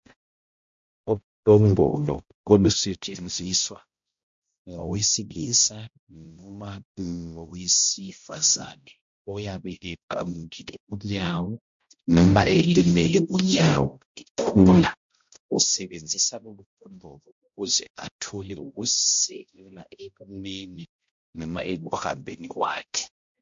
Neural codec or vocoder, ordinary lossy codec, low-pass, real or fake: codec, 16 kHz, 0.5 kbps, X-Codec, HuBERT features, trained on balanced general audio; MP3, 48 kbps; 7.2 kHz; fake